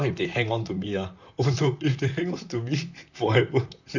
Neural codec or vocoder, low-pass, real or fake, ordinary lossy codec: vocoder, 44.1 kHz, 128 mel bands, Pupu-Vocoder; 7.2 kHz; fake; none